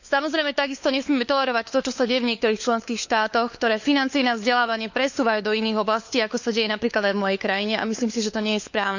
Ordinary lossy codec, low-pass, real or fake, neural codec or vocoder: none; 7.2 kHz; fake; codec, 16 kHz, 4 kbps, FunCodec, trained on LibriTTS, 50 frames a second